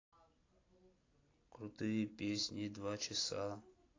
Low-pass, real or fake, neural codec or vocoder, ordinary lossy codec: 7.2 kHz; real; none; AAC, 32 kbps